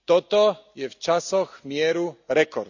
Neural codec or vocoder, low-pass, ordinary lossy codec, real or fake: none; 7.2 kHz; none; real